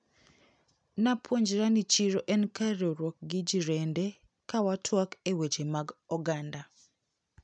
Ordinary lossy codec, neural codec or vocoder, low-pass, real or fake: none; none; 9.9 kHz; real